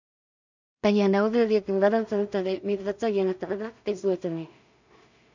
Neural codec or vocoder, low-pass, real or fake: codec, 16 kHz in and 24 kHz out, 0.4 kbps, LongCat-Audio-Codec, two codebook decoder; 7.2 kHz; fake